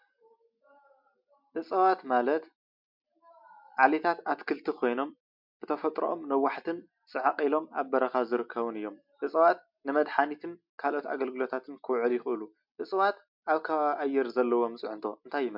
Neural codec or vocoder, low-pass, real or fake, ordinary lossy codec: none; 5.4 kHz; real; MP3, 48 kbps